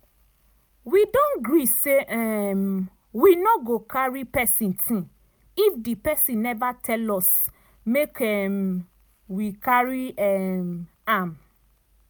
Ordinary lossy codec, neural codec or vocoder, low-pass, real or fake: none; none; none; real